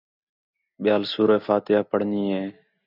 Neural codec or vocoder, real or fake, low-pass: none; real; 5.4 kHz